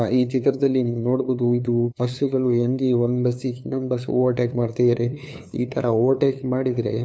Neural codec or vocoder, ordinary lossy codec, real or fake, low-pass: codec, 16 kHz, 2 kbps, FunCodec, trained on LibriTTS, 25 frames a second; none; fake; none